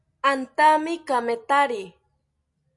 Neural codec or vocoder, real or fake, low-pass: none; real; 10.8 kHz